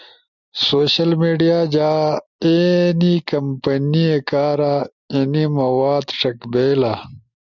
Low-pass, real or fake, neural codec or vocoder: 7.2 kHz; real; none